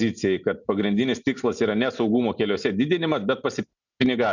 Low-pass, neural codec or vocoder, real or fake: 7.2 kHz; none; real